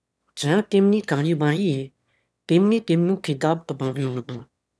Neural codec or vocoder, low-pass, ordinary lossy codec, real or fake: autoencoder, 22.05 kHz, a latent of 192 numbers a frame, VITS, trained on one speaker; none; none; fake